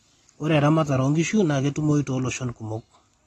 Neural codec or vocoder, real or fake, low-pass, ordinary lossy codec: none; real; 19.8 kHz; AAC, 32 kbps